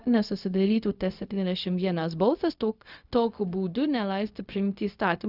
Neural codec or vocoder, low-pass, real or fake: codec, 16 kHz, 0.4 kbps, LongCat-Audio-Codec; 5.4 kHz; fake